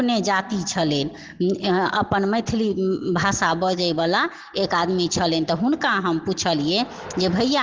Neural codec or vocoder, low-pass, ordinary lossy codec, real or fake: none; 7.2 kHz; Opus, 16 kbps; real